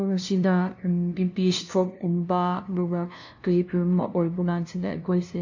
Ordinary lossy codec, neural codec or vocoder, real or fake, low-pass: AAC, 48 kbps; codec, 16 kHz, 0.5 kbps, FunCodec, trained on LibriTTS, 25 frames a second; fake; 7.2 kHz